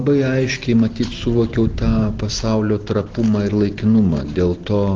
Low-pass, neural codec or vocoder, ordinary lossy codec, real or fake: 7.2 kHz; none; Opus, 16 kbps; real